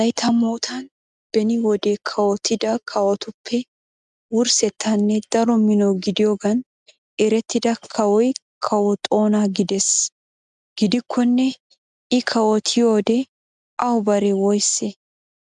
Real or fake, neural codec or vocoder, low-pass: real; none; 10.8 kHz